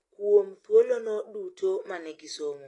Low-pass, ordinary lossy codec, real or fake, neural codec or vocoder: 10.8 kHz; AAC, 32 kbps; real; none